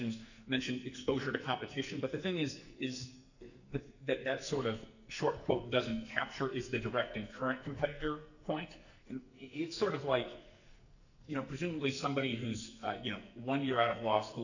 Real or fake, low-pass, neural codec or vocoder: fake; 7.2 kHz; codec, 44.1 kHz, 2.6 kbps, SNAC